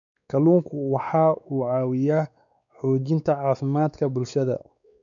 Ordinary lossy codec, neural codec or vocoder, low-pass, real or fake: none; codec, 16 kHz, 4 kbps, X-Codec, WavLM features, trained on Multilingual LibriSpeech; 7.2 kHz; fake